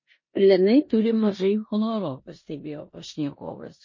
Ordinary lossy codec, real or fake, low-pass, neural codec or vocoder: MP3, 32 kbps; fake; 7.2 kHz; codec, 16 kHz in and 24 kHz out, 0.9 kbps, LongCat-Audio-Codec, four codebook decoder